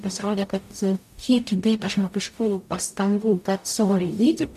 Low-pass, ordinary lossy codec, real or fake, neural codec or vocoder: 14.4 kHz; MP3, 96 kbps; fake; codec, 44.1 kHz, 0.9 kbps, DAC